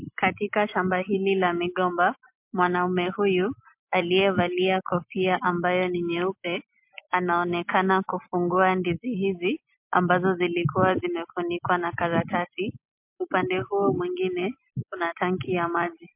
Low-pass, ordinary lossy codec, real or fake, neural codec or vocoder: 3.6 kHz; MP3, 32 kbps; real; none